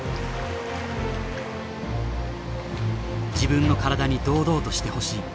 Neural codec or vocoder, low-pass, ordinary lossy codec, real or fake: none; none; none; real